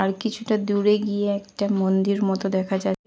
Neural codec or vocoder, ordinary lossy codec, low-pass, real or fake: none; none; none; real